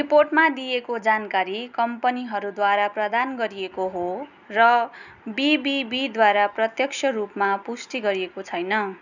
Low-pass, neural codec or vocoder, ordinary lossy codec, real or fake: 7.2 kHz; none; none; real